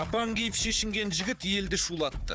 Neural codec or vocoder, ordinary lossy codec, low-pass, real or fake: codec, 16 kHz, 4 kbps, FunCodec, trained on Chinese and English, 50 frames a second; none; none; fake